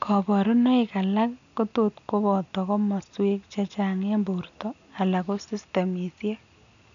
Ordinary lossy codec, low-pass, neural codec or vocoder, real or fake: none; 7.2 kHz; none; real